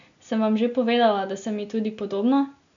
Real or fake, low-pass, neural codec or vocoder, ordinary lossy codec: real; 7.2 kHz; none; MP3, 96 kbps